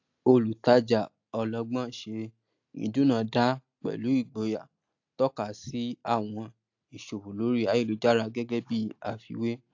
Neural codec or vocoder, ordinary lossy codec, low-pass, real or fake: none; AAC, 48 kbps; 7.2 kHz; real